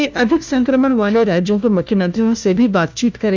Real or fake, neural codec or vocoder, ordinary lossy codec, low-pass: fake; codec, 16 kHz, 1 kbps, FunCodec, trained on LibriTTS, 50 frames a second; none; none